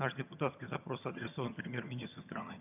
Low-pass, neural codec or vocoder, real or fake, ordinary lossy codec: 3.6 kHz; vocoder, 22.05 kHz, 80 mel bands, HiFi-GAN; fake; none